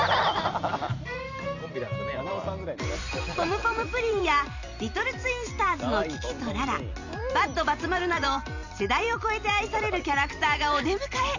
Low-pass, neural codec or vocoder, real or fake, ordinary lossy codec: 7.2 kHz; vocoder, 44.1 kHz, 128 mel bands every 256 samples, BigVGAN v2; fake; none